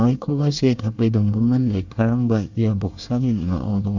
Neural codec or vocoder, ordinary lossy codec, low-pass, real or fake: codec, 24 kHz, 1 kbps, SNAC; none; 7.2 kHz; fake